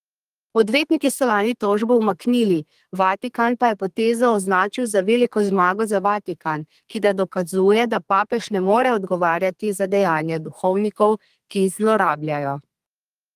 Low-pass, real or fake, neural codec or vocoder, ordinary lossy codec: 14.4 kHz; fake; codec, 32 kHz, 1.9 kbps, SNAC; Opus, 24 kbps